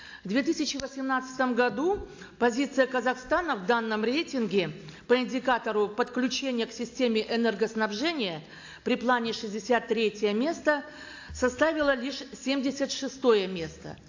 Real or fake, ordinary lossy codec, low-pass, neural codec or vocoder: real; AAC, 48 kbps; 7.2 kHz; none